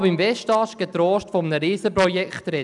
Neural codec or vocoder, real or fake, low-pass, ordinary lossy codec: none; real; 10.8 kHz; none